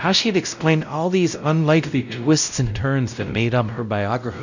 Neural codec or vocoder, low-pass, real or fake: codec, 16 kHz, 0.5 kbps, X-Codec, WavLM features, trained on Multilingual LibriSpeech; 7.2 kHz; fake